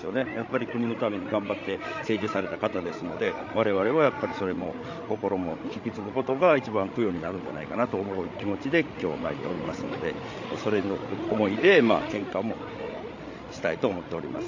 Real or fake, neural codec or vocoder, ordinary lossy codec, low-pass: fake; codec, 16 kHz, 16 kbps, FreqCodec, larger model; AAC, 48 kbps; 7.2 kHz